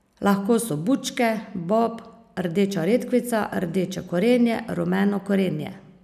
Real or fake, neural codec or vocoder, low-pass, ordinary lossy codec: real; none; 14.4 kHz; none